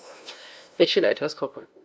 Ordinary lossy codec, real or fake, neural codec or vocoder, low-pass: none; fake; codec, 16 kHz, 0.5 kbps, FunCodec, trained on LibriTTS, 25 frames a second; none